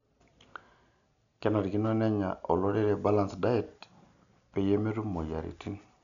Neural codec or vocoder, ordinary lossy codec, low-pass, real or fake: none; none; 7.2 kHz; real